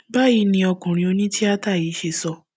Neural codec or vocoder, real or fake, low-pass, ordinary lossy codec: none; real; none; none